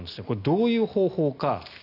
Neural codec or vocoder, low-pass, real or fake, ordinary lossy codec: none; 5.4 kHz; real; AAC, 32 kbps